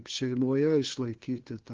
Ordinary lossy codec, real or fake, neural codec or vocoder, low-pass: Opus, 16 kbps; fake; codec, 16 kHz, 2 kbps, FunCodec, trained on LibriTTS, 25 frames a second; 7.2 kHz